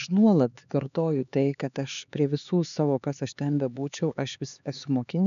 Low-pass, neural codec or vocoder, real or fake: 7.2 kHz; codec, 16 kHz, 4 kbps, X-Codec, HuBERT features, trained on LibriSpeech; fake